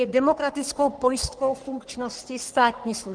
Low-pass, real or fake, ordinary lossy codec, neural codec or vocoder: 9.9 kHz; fake; Opus, 24 kbps; codec, 44.1 kHz, 3.4 kbps, Pupu-Codec